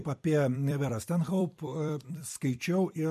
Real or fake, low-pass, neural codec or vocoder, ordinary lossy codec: fake; 14.4 kHz; vocoder, 44.1 kHz, 128 mel bands every 256 samples, BigVGAN v2; MP3, 64 kbps